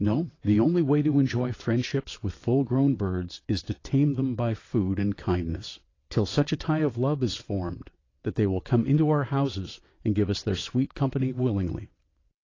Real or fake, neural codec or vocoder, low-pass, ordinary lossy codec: fake; vocoder, 22.05 kHz, 80 mel bands, WaveNeXt; 7.2 kHz; AAC, 32 kbps